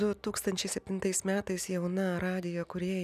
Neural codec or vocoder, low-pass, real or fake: none; 14.4 kHz; real